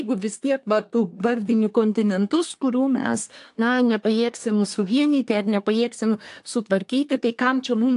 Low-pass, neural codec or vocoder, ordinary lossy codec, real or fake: 10.8 kHz; codec, 24 kHz, 1 kbps, SNAC; AAC, 64 kbps; fake